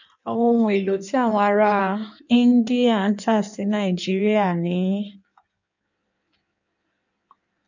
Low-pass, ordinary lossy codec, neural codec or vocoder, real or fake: 7.2 kHz; none; codec, 16 kHz in and 24 kHz out, 1.1 kbps, FireRedTTS-2 codec; fake